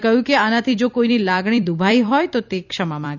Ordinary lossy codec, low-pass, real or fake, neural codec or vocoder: none; 7.2 kHz; fake; vocoder, 44.1 kHz, 128 mel bands every 256 samples, BigVGAN v2